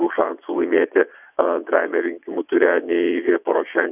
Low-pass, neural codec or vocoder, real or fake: 3.6 kHz; vocoder, 22.05 kHz, 80 mel bands, WaveNeXt; fake